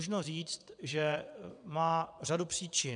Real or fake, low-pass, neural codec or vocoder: fake; 9.9 kHz; vocoder, 22.05 kHz, 80 mel bands, WaveNeXt